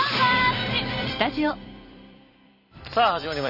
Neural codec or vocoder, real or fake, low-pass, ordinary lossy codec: vocoder, 44.1 kHz, 128 mel bands every 256 samples, BigVGAN v2; fake; 5.4 kHz; none